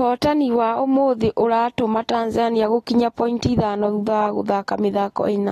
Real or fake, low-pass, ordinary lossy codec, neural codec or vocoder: real; 19.8 kHz; AAC, 32 kbps; none